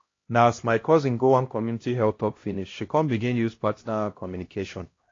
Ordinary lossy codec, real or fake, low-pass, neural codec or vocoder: AAC, 32 kbps; fake; 7.2 kHz; codec, 16 kHz, 1 kbps, X-Codec, HuBERT features, trained on LibriSpeech